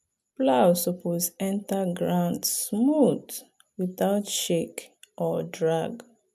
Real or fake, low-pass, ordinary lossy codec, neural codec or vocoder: real; 14.4 kHz; none; none